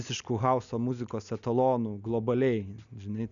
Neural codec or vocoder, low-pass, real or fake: none; 7.2 kHz; real